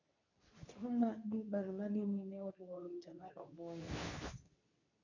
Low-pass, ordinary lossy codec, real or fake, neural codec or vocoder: 7.2 kHz; none; fake; codec, 24 kHz, 0.9 kbps, WavTokenizer, medium speech release version 2